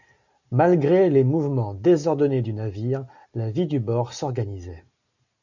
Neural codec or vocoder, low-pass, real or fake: none; 7.2 kHz; real